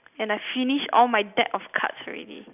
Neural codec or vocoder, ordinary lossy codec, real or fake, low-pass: none; none; real; 3.6 kHz